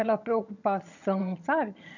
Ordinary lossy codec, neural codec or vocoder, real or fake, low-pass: none; vocoder, 22.05 kHz, 80 mel bands, HiFi-GAN; fake; 7.2 kHz